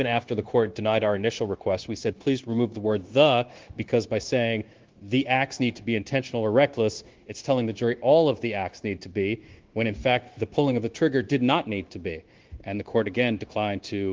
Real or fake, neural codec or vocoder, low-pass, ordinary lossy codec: fake; codec, 16 kHz, 0.9 kbps, LongCat-Audio-Codec; 7.2 kHz; Opus, 16 kbps